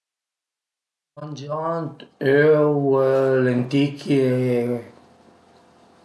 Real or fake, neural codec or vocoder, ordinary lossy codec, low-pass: real; none; none; none